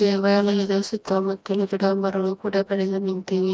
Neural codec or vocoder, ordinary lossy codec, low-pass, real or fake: codec, 16 kHz, 1 kbps, FreqCodec, smaller model; none; none; fake